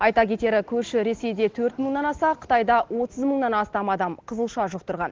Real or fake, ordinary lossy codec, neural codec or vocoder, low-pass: real; Opus, 16 kbps; none; 7.2 kHz